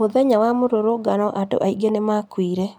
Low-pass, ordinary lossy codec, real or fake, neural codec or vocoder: 19.8 kHz; none; real; none